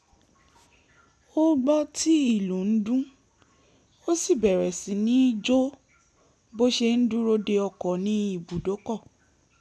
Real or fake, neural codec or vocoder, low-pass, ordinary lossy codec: real; none; none; none